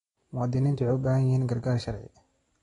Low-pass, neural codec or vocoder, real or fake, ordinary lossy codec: 10.8 kHz; none; real; AAC, 32 kbps